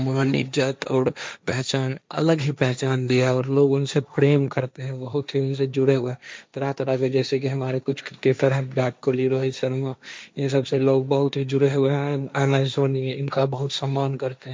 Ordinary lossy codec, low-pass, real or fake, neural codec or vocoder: none; none; fake; codec, 16 kHz, 1.1 kbps, Voila-Tokenizer